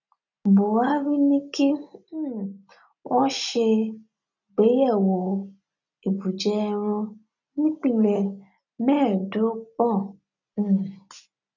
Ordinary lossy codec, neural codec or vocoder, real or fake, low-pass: none; none; real; 7.2 kHz